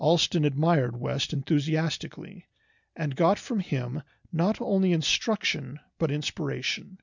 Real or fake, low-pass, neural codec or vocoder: real; 7.2 kHz; none